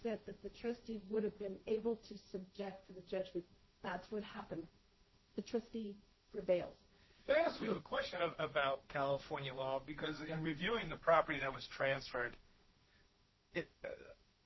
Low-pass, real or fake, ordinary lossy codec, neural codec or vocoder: 7.2 kHz; fake; MP3, 24 kbps; codec, 16 kHz, 1.1 kbps, Voila-Tokenizer